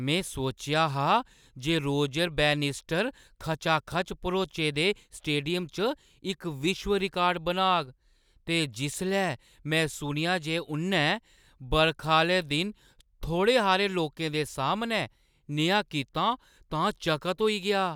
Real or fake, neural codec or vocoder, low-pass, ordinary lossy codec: real; none; none; none